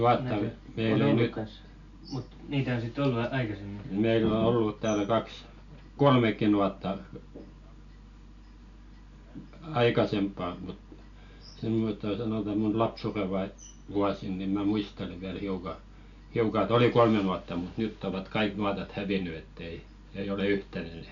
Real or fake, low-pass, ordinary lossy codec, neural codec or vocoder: real; 7.2 kHz; none; none